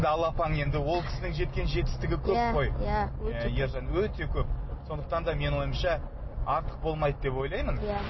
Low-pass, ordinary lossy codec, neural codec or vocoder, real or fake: 7.2 kHz; MP3, 24 kbps; none; real